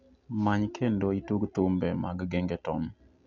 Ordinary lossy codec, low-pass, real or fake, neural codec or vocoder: none; 7.2 kHz; real; none